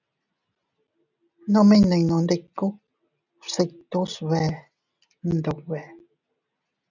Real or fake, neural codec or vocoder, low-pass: real; none; 7.2 kHz